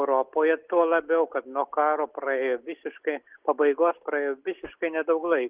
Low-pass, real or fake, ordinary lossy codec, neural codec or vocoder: 3.6 kHz; real; Opus, 32 kbps; none